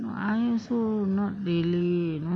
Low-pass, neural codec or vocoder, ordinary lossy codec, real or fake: 9.9 kHz; none; none; real